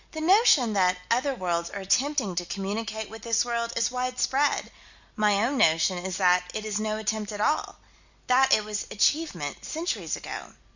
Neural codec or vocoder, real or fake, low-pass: none; real; 7.2 kHz